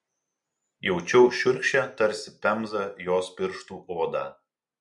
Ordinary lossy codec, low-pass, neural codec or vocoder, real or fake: MP3, 64 kbps; 10.8 kHz; none; real